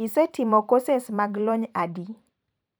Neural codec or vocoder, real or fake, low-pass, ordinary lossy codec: vocoder, 44.1 kHz, 128 mel bands every 512 samples, BigVGAN v2; fake; none; none